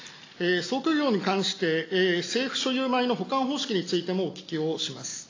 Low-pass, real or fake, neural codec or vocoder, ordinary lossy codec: 7.2 kHz; real; none; AAC, 32 kbps